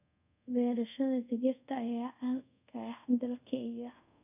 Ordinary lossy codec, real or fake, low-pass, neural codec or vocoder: none; fake; 3.6 kHz; codec, 24 kHz, 0.5 kbps, DualCodec